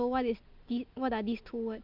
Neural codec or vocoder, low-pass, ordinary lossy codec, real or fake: none; 5.4 kHz; Opus, 24 kbps; real